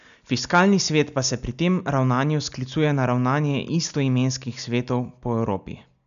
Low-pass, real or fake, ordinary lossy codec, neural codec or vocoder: 7.2 kHz; real; none; none